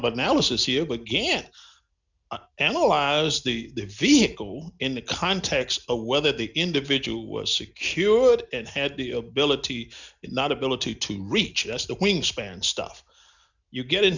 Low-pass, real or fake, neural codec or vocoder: 7.2 kHz; real; none